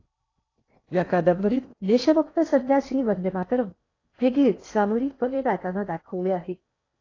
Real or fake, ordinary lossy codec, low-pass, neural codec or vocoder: fake; AAC, 32 kbps; 7.2 kHz; codec, 16 kHz in and 24 kHz out, 0.8 kbps, FocalCodec, streaming, 65536 codes